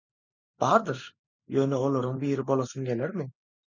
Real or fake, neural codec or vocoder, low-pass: real; none; 7.2 kHz